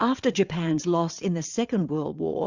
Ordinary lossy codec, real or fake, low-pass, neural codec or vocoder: Opus, 64 kbps; fake; 7.2 kHz; vocoder, 22.05 kHz, 80 mel bands, WaveNeXt